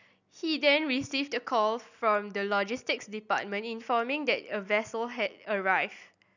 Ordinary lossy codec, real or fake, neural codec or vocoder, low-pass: none; real; none; 7.2 kHz